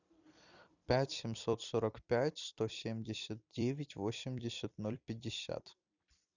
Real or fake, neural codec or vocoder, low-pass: fake; vocoder, 44.1 kHz, 128 mel bands every 256 samples, BigVGAN v2; 7.2 kHz